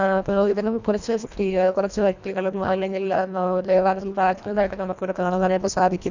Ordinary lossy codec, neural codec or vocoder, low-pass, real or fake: none; codec, 24 kHz, 1.5 kbps, HILCodec; 7.2 kHz; fake